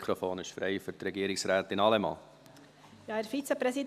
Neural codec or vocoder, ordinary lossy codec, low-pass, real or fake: none; none; 14.4 kHz; real